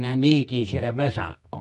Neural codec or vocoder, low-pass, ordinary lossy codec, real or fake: codec, 24 kHz, 0.9 kbps, WavTokenizer, medium music audio release; 10.8 kHz; none; fake